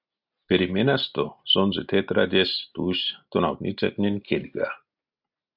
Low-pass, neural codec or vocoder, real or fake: 5.4 kHz; none; real